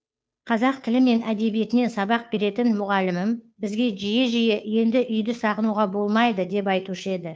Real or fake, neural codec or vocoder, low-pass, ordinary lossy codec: fake; codec, 16 kHz, 2 kbps, FunCodec, trained on Chinese and English, 25 frames a second; none; none